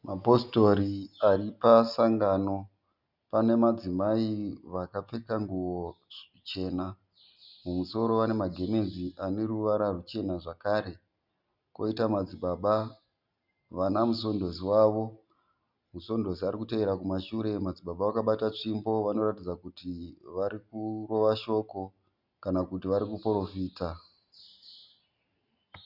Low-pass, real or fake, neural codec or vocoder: 5.4 kHz; real; none